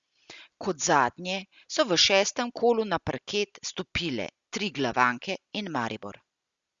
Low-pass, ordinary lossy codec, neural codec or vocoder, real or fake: 7.2 kHz; Opus, 64 kbps; none; real